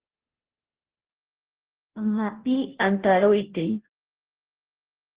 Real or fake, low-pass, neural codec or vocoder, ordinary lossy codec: fake; 3.6 kHz; codec, 16 kHz, 0.5 kbps, FunCodec, trained on Chinese and English, 25 frames a second; Opus, 16 kbps